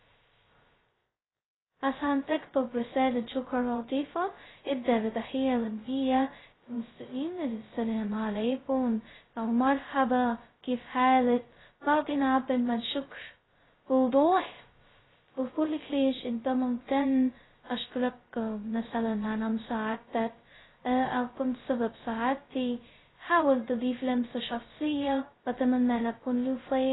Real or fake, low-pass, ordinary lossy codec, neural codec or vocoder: fake; 7.2 kHz; AAC, 16 kbps; codec, 16 kHz, 0.2 kbps, FocalCodec